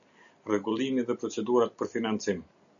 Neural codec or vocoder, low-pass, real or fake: none; 7.2 kHz; real